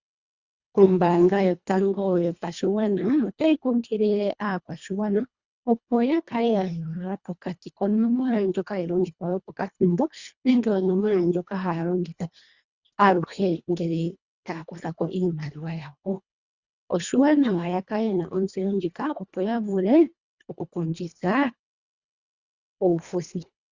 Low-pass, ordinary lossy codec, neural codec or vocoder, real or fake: 7.2 kHz; Opus, 64 kbps; codec, 24 kHz, 1.5 kbps, HILCodec; fake